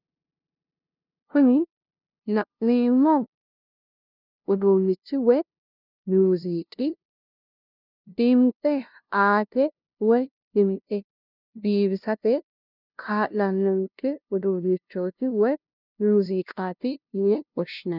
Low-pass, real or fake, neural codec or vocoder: 5.4 kHz; fake; codec, 16 kHz, 0.5 kbps, FunCodec, trained on LibriTTS, 25 frames a second